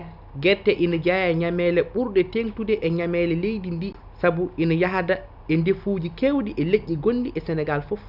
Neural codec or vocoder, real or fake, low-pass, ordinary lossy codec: none; real; 5.4 kHz; none